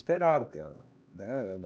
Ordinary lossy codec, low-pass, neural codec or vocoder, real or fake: none; none; codec, 16 kHz, 2 kbps, X-Codec, HuBERT features, trained on LibriSpeech; fake